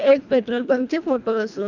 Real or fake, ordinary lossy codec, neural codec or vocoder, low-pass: fake; none; codec, 24 kHz, 1.5 kbps, HILCodec; 7.2 kHz